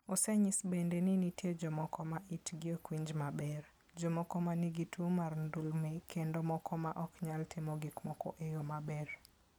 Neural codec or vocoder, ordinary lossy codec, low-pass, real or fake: none; none; none; real